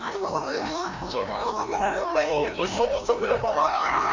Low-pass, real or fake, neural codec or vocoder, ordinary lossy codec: 7.2 kHz; fake; codec, 16 kHz, 1 kbps, FreqCodec, larger model; MP3, 64 kbps